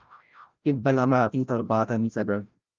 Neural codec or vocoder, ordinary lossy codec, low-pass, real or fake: codec, 16 kHz, 0.5 kbps, FreqCodec, larger model; Opus, 32 kbps; 7.2 kHz; fake